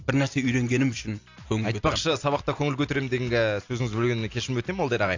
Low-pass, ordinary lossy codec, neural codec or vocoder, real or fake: 7.2 kHz; AAC, 48 kbps; none; real